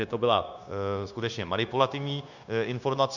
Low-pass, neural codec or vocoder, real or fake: 7.2 kHz; codec, 16 kHz, 0.9 kbps, LongCat-Audio-Codec; fake